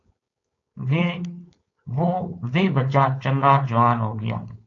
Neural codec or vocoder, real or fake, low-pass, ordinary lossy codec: codec, 16 kHz, 4.8 kbps, FACodec; fake; 7.2 kHz; AAC, 48 kbps